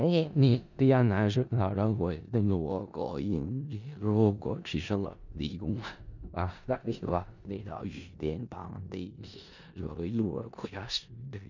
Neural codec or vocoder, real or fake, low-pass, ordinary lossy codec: codec, 16 kHz in and 24 kHz out, 0.4 kbps, LongCat-Audio-Codec, four codebook decoder; fake; 7.2 kHz; none